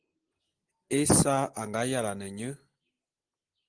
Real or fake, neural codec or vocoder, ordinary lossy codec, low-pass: real; none; Opus, 24 kbps; 9.9 kHz